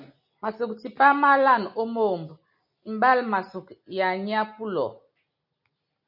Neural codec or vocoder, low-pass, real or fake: none; 5.4 kHz; real